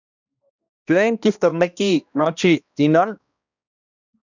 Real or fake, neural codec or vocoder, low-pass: fake; codec, 16 kHz, 1 kbps, X-Codec, HuBERT features, trained on balanced general audio; 7.2 kHz